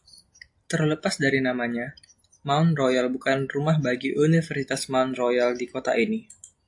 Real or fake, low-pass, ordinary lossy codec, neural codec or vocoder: real; 10.8 kHz; AAC, 64 kbps; none